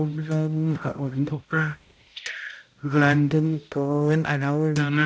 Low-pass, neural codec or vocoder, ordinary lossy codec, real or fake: none; codec, 16 kHz, 0.5 kbps, X-Codec, HuBERT features, trained on balanced general audio; none; fake